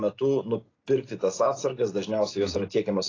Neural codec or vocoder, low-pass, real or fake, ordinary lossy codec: none; 7.2 kHz; real; AAC, 32 kbps